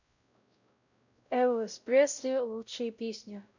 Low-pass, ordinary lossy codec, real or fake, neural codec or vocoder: 7.2 kHz; none; fake; codec, 16 kHz, 0.5 kbps, X-Codec, WavLM features, trained on Multilingual LibriSpeech